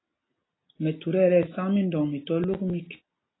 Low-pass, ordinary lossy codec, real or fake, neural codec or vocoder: 7.2 kHz; AAC, 16 kbps; real; none